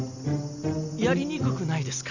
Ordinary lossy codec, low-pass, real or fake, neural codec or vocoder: none; 7.2 kHz; real; none